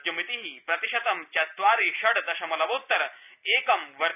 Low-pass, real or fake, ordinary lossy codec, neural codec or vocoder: 3.6 kHz; real; none; none